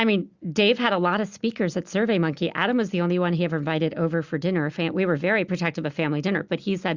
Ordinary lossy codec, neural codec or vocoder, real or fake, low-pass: Opus, 64 kbps; none; real; 7.2 kHz